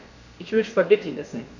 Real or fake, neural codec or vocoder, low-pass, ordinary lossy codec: fake; codec, 16 kHz, about 1 kbps, DyCAST, with the encoder's durations; 7.2 kHz; none